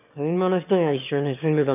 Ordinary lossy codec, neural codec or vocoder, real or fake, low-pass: none; autoencoder, 22.05 kHz, a latent of 192 numbers a frame, VITS, trained on one speaker; fake; 3.6 kHz